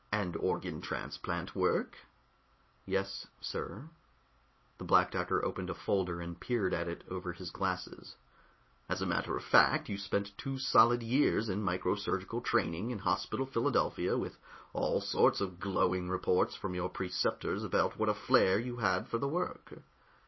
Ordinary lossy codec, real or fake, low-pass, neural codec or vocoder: MP3, 24 kbps; real; 7.2 kHz; none